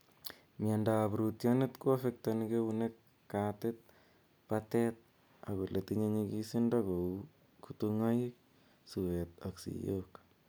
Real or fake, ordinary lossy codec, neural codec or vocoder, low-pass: real; none; none; none